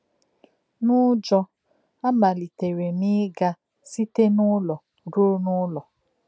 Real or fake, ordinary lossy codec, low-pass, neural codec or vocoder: real; none; none; none